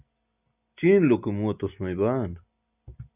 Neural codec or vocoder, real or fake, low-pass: none; real; 3.6 kHz